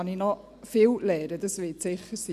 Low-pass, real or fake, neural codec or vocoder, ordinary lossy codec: 14.4 kHz; real; none; none